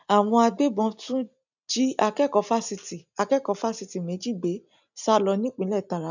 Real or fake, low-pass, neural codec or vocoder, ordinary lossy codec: real; 7.2 kHz; none; none